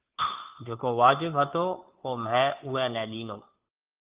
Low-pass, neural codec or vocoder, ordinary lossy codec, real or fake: 3.6 kHz; codec, 16 kHz, 2 kbps, FunCodec, trained on Chinese and English, 25 frames a second; Opus, 32 kbps; fake